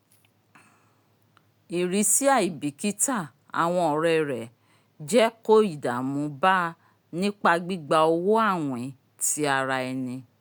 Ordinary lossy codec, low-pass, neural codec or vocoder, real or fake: none; none; none; real